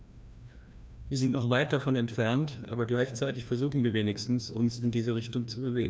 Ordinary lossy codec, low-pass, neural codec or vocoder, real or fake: none; none; codec, 16 kHz, 1 kbps, FreqCodec, larger model; fake